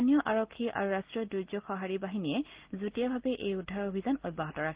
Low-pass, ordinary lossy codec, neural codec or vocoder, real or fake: 3.6 kHz; Opus, 16 kbps; none; real